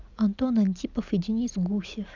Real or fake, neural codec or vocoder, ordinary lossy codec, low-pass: real; none; none; 7.2 kHz